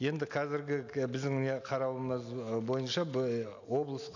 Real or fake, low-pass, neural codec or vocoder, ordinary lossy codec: real; 7.2 kHz; none; none